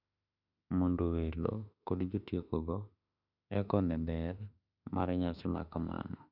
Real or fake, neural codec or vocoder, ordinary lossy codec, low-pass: fake; autoencoder, 48 kHz, 32 numbers a frame, DAC-VAE, trained on Japanese speech; none; 5.4 kHz